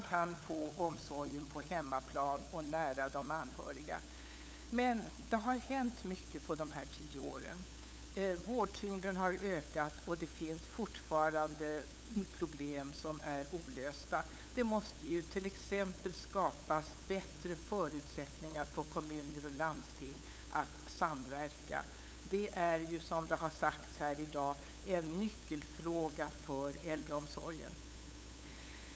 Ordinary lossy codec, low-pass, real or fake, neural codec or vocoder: none; none; fake; codec, 16 kHz, 8 kbps, FunCodec, trained on LibriTTS, 25 frames a second